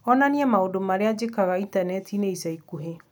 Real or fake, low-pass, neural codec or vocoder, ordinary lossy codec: real; none; none; none